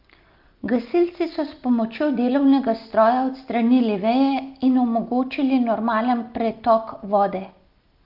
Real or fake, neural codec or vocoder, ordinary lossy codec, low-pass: real; none; Opus, 32 kbps; 5.4 kHz